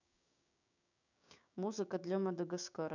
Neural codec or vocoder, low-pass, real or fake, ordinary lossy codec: codec, 16 kHz, 6 kbps, DAC; 7.2 kHz; fake; none